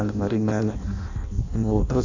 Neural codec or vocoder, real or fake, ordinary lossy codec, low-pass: codec, 16 kHz in and 24 kHz out, 0.6 kbps, FireRedTTS-2 codec; fake; none; 7.2 kHz